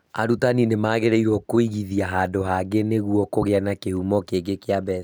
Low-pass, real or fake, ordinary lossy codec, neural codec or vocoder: none; real; none; none